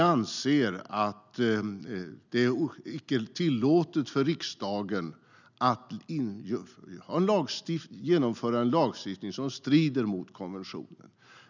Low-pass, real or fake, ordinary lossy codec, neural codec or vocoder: 7.2 kHz; real; none; none